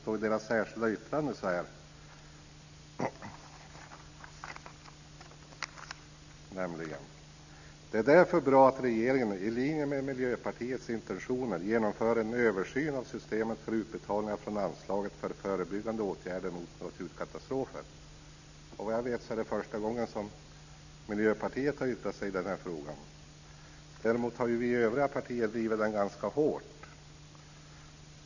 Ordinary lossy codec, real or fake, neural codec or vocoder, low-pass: none; real; none; 7.2 kHz